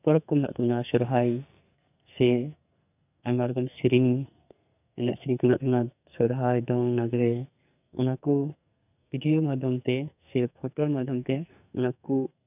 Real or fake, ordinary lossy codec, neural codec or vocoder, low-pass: fake; none; codec, 44.1 kHz, 2.6 kbps, SNAC; 3.6 kHz